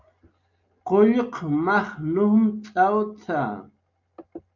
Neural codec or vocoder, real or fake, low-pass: none; real; 7.2 kHz